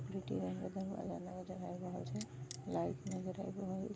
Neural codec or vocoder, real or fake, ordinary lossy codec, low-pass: none; real; none; none